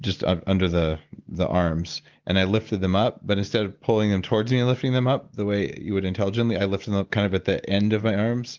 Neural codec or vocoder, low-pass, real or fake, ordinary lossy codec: none; 7.2 kHz; real; Opus, 32 kbps